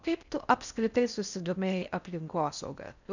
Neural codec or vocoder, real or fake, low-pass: codec, 16 kHz in and 24 kHz out, 0.6 kbps, FocalCodec, streaming, 4096 codes; fake; 7.2 kHz